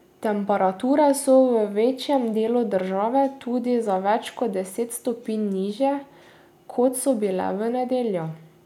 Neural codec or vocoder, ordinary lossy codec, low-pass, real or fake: none; none; 19.8 kHz; real